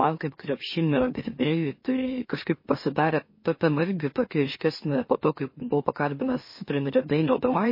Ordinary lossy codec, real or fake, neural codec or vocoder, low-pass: MP3, 24 kbps; fake; autoencoder, 44.1 kHz, a latent of 192 numbers a frame, MeloTTS; 5.4 kHz